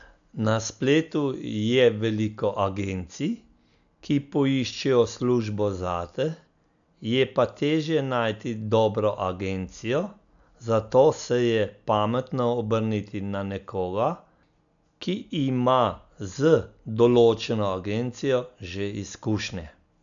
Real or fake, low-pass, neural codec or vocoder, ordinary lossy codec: real; 7.2 kHz; none; none